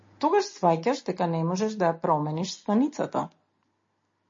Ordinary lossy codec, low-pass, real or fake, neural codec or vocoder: MP3, 32 kbps; 7.2 kHz; real; none